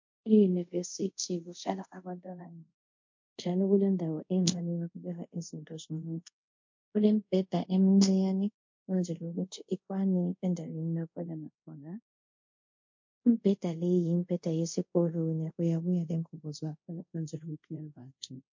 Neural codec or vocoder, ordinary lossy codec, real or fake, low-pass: codec, 24 kHz, 0.5 kbps, DualCodec; MP3, 48 kbps; fake; 7.2 kHz